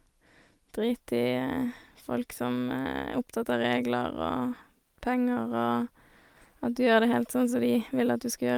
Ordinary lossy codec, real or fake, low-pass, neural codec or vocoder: Opus, 32 kbps; real; 19.8 kHz; none